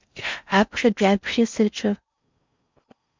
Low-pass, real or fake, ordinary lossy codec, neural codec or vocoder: 7.2 kHz; fake; MP3, 64 kbps; codec, 16 kHz in and 24 kHz out, 0.6 kbps, FocalCodec, streaming, 4096 codes